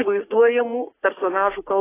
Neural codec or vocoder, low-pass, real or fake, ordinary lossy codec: codec, 44.1 kHz, 3.4 kbps, Pupu-Codec; 3.6 kHz; fake; AAC, 16 kbps